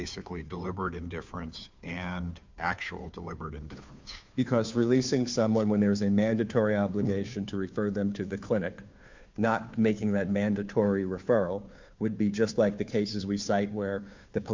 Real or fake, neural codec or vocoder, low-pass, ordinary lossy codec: fake; codec, 16 kHz, 2 kbps, FunCodec, trained on Chinese and English, 25 frames a second; 7.2 kHz; MP3, 64 kbps